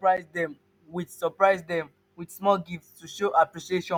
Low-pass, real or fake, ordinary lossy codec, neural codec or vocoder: 19.8 kHz; real; none; none